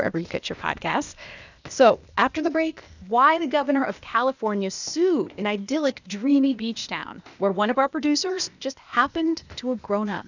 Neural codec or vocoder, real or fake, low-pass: codec, 16 kHz, 0.8 kbps, ZipCodec; fake; 7.2 kHz